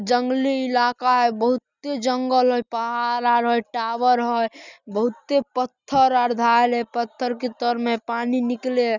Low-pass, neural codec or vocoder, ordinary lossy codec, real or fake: 7.2 kHz; none; none; real